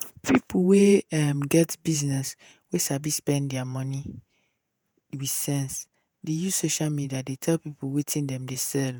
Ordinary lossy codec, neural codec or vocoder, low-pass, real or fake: none; vocoder, 48 kHz, 128 mel bands, Vocos; none; fake